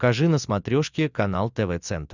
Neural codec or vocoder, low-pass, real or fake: none; 7.2 kHz; real